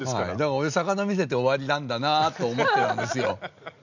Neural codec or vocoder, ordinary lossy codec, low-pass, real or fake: none; none; 7.2 kHz; real